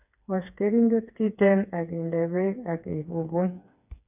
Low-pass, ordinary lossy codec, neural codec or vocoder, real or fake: 3.6 kHz; none; codec, 16 kHz, 4 kbps, FreqCodec, smaller model; fake